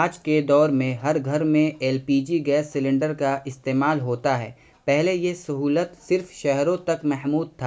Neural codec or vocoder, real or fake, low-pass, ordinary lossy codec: none; real; none; none